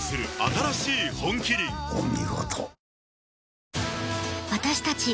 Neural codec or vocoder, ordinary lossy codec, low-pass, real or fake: none; none; none; real